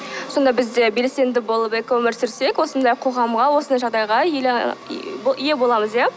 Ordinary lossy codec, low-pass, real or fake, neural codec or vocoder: none; none; real; none